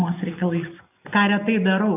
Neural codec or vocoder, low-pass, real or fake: none; 3.6 kHz; real